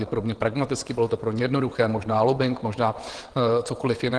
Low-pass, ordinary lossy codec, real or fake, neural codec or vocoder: 9.9 kHz; Opus, 16 kbps; fake; vocoder, 22.05 kHz, 80 mel bands, WaveNeXt